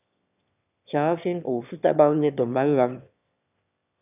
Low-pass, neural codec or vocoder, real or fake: 3.6 kHz; autoencoder, 22.05 kHz, a latent of 192 numbers a frame, VITS, trained on one speaker; fake